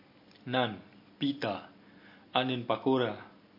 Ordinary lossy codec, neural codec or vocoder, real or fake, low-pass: MP3, 32 kbps; none; real; 5.4 kHz